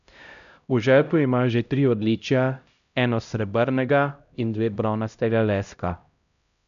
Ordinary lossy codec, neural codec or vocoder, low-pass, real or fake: none; codec, 16 kHz, 0.5 kbps, X-Codec, HuBERT features, trained on LibriSpeech; 7.2 kHz; fake